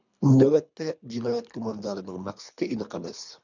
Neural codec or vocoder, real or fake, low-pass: codec, 24 kHz, 3 kbps, HILCodec; fake; 7.2 kHz